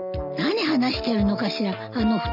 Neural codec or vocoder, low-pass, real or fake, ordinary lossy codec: none; 5.4 kHz; real; none